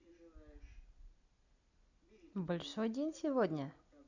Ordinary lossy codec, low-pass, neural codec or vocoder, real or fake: none; 7.2 kHz; none; real